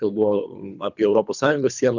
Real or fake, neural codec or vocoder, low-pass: fake; codec, 24 kHz, 3 kbps, HILCodec; 7.2 kHz